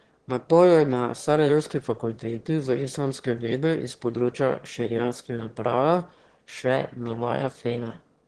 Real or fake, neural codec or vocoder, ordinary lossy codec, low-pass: fake; autoencoder, 22.05 kHz, a latent of 192 numbers a frame, VITS, trained on one speaker; Opus, 16 kbps; 9.9 kHz